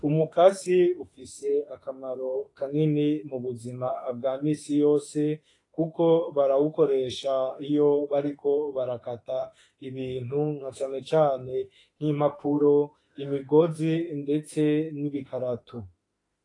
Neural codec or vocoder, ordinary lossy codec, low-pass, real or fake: autoencoder, 48 kHz, 32 numbers a frame, DAC-VAE, trained on Japanese speech; AAC, 32 kbps; 10.8 kHz; fake